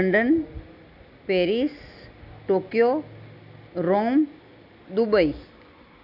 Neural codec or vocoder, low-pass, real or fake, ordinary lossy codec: none; 5.4 kHz; real; none